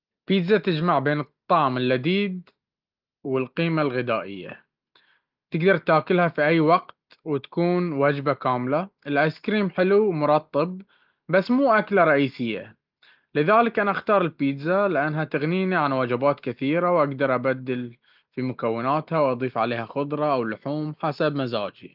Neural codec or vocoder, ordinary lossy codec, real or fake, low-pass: none; Opus, 32 kbps; real; 5.4 kHz